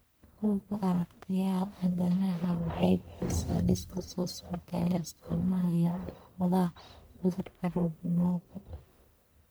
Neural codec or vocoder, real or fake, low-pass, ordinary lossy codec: codec, 44.1 kHz, 1.7 kbps, Pupu-Codec; fake; none; none